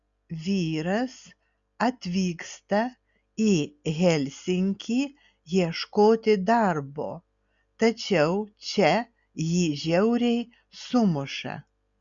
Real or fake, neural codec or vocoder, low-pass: real; none; 7.2 kHz